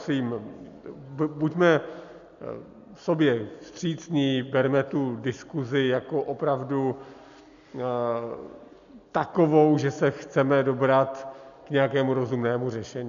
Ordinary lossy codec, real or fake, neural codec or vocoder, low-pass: MP3, 96 kbps; real; none; 7.2 kHz